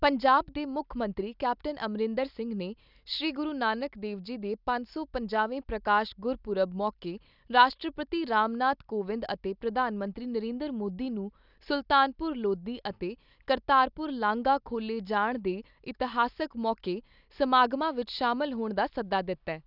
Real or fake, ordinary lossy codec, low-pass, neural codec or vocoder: real; none; 5.4 kHz; none